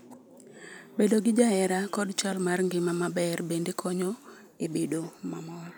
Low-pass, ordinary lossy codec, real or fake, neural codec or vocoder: none; none; real; none